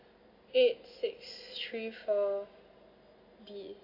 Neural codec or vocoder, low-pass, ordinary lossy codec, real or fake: none; 5.4 kHz; none; real